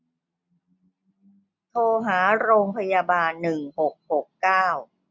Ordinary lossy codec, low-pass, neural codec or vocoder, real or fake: none; none; none; real